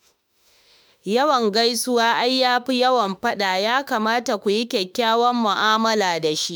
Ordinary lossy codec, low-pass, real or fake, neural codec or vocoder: none; none; fake; autoencoder, 48 kHz, 32 numbers a frame, DAC-VAE, trained on Japanese speech